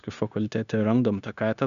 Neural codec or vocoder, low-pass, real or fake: codec, 16 kHz, 0.9 kbps, LongCat-Audio-Codec; 7.2 kHz; fake